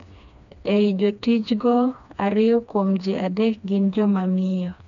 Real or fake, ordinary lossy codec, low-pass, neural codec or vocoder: fake; none; 7.2 kHz; codec, 16 kHz, 2 kbps, FreqCodec, smaller model